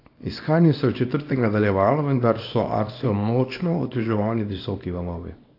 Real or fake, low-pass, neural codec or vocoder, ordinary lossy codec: fake; 5.4 kHz; codec, 24 kHz, 0.9 kbps, WavTokenizer, small release; AAC, 32 kbps